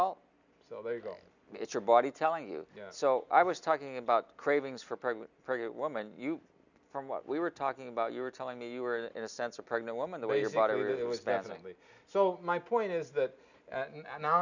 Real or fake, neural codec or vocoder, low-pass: real; none; 7.2 kHz